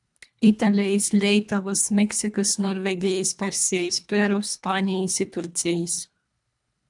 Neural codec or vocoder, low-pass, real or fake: codec, 24 kHz, 1.5 kbps, HILCodec; 10.8 kHz; fake